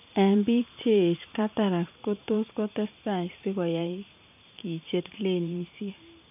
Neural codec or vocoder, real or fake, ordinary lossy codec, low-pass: vocoder, 44.1 kHz, 128 mel bands every 512 samples, BigVGAN v2; fake; none; 3.6 kHz